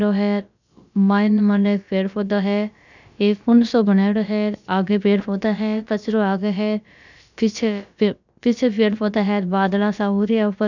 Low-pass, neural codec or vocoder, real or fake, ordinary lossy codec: 7.2 kHz; codec, 16 kHz, about 1 kbps, DyCAST, with the encoder's durations; fake; none